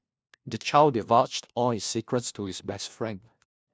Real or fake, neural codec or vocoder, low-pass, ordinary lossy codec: fake; codec, 16 kHz, 1 kbps, FunCodec, trained on LibriTTS, 50 frames a second; none; none